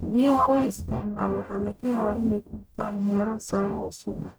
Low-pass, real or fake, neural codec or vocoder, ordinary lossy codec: none; fake; codec, 44.1 kHz, 0.9 kbps, DAC; none